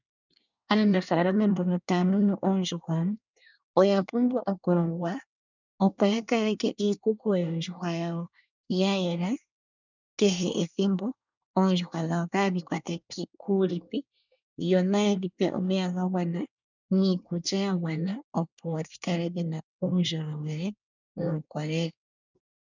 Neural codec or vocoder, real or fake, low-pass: codec, 24 kHz, 1 kbps, SNAC; fake; 7.2 kHz